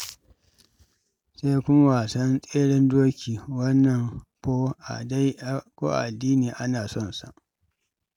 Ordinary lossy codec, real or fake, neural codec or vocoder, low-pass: none; real; none; 19.8 kHz